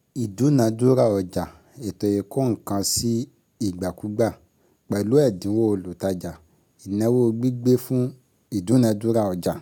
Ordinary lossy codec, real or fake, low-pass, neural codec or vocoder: none; real; 19.8 kHz; none